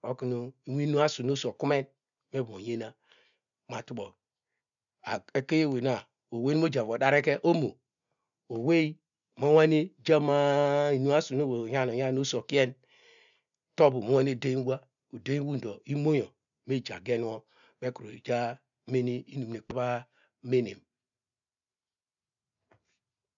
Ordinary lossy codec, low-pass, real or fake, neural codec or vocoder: none; 7.2 kHz; real; none